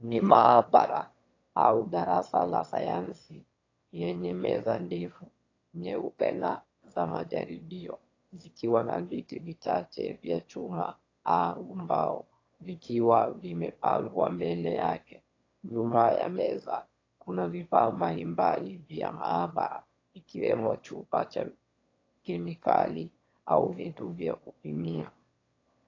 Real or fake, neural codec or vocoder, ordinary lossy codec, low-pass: fake; autoencoder, 22.05 kHz, a latent of 192 numbers a frame, VITS, trained on one speaker; AAC, 32 kbps; 7.2 kHz